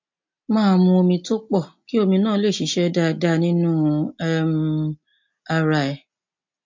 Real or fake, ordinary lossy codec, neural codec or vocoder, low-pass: real; MP3, 48 kbps; none; 7.2 kHz